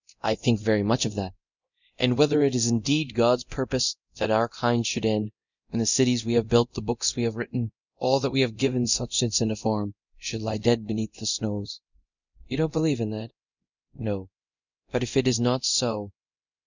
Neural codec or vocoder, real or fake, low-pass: codec, 24 kHz, 0.9 kbps, DualCodec; fake; 7.2 kHz